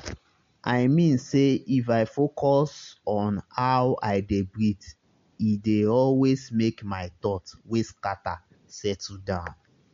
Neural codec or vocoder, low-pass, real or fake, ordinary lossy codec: none; 7.2 kHz; real; MP3, 48 kbps